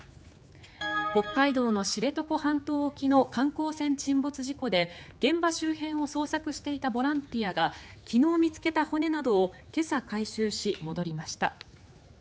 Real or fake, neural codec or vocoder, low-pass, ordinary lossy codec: fake; codec, 16 kHz, 4 kbps, X-Codec, HuBERT features, trained on general audio; none; none